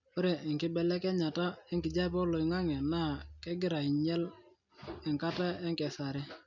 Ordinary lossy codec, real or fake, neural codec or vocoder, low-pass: none; real; none; 7.2 kHz